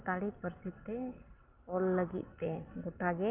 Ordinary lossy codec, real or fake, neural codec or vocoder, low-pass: Opus, 24 kbps; real; none; 3.6 kHz